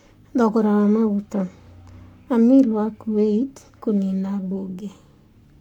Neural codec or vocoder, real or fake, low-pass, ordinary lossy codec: codec, 44.1 kHz, 7.8 kbps, Pupu-Codec; fake; 19.8 kHz; none